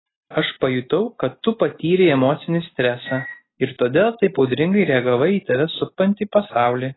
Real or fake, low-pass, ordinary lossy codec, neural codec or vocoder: real; 7.2 kHz; AAC, 16 kbps; none